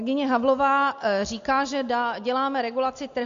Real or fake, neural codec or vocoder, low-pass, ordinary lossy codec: real; none; 7.2 kHz; MP3, 48 kbps